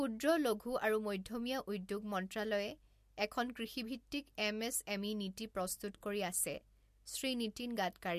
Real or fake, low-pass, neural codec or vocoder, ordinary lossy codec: real; 14.4 kHz; none; MP3, 64 kbps